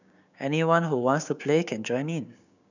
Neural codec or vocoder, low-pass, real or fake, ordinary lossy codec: codec, 16 kHz, 6 kbps, DAC; 7.2 kHz; fake; none